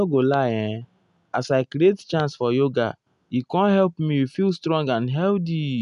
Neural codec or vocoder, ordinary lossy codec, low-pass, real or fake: none; none; 10.8 kHz; real